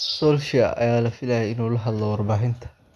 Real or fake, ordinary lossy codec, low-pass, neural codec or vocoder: real; none; none; none